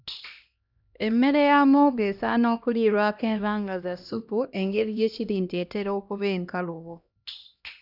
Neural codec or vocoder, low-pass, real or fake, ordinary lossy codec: codec, 16 kHz, 1 kbps, X-Codec, HuBERT features, trained on LibriSpeech; 5.4 kHz; fake; none